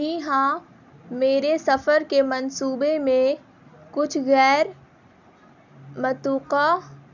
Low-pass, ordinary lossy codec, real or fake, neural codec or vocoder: 7.2 kHz; none; real; none